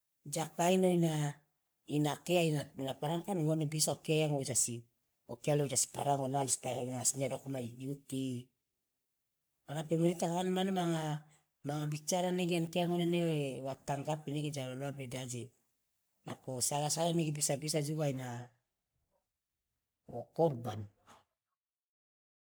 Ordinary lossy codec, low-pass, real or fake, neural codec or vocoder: none; none; fake; codec, 44.1 kHz, 3.4 kbps, Pupu-Codec